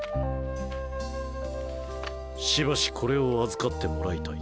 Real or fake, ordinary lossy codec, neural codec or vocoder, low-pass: real; none; none; none